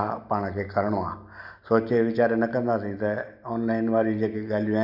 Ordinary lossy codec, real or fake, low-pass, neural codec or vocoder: none; real; 5.4 kHz; none